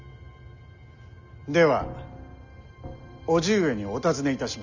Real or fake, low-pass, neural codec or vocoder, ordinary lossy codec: real; 7.2 kHz; none; none